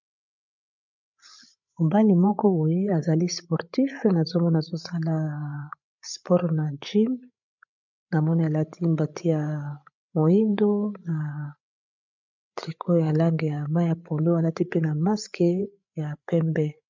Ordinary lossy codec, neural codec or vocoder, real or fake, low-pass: MP3, 64 kbps; codec, 16 kHz, 8 kbps, FreqCodec, larger model; fake; 7.2 kHz